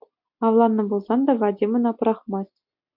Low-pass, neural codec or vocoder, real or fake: 5.4 kHz; none; real